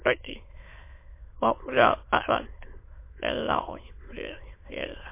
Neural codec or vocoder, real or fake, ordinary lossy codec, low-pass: autoencoder, 22.05 kHz, a latent of 192 numbers a frame, VITS, trained on many speakers; fake; MP3, 24 kbps; 3.6 kHz